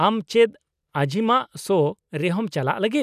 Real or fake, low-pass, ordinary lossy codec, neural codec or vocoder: real; 14.4 kHz; none; none